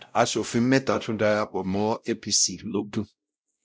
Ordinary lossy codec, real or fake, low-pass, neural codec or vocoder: none; fake; none; codec, 16 kHz, 0.5 kbps, X-Codec, WavLM features, trained on Multilingual LibriSpeech